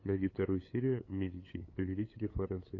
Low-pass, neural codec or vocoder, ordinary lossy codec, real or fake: 7.2 kHz; codec, 16 kHz, 8 kbps, FunCodec, trained on LibriTTS, 25 frames a second; AAC, 32 kbps; fake